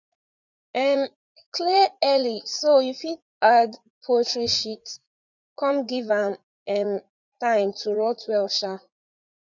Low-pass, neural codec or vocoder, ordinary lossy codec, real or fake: 7.2 kHz; vocoder, 44.1 kHz, 80 mel bands, Vocos; none; fake